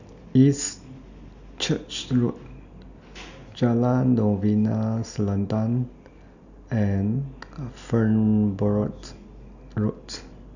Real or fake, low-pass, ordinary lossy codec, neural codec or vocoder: real; 7.2 kHz; none; none